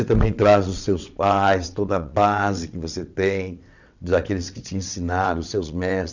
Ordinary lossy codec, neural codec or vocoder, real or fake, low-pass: none; vocoder, 22.05 kHz, 80 mel bands, WaveNeXt; fake; 7.2 kHz